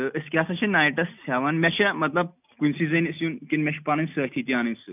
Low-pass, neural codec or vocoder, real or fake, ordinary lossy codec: 3.6 kHz; none; real; none